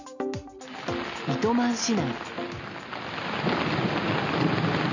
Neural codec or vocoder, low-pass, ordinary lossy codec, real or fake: none; 7.2 kHz; none; real